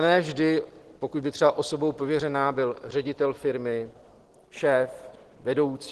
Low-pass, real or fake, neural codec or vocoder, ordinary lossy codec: 10.8 kHz; real; none; Opus, 16 kbps